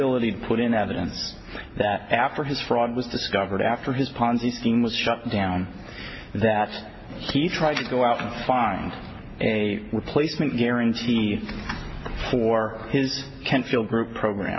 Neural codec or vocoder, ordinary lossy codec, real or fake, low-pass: none; MP3, 24 kbps; real; 7.2 kHz